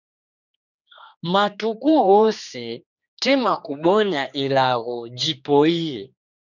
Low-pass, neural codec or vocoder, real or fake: 7.2 kHz; codec, 16 kHz, 2 kbps, X-Codec, HuBERT features, trained on general audio; fake